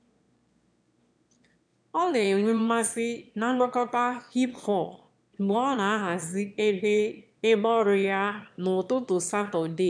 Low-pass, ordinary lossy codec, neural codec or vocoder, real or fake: 9.9 kHz; MP3, 96 kbps; autoencoder, 22.05 kHz, a latent of 192 numbers a frame, VITS, trained on one speaker; fake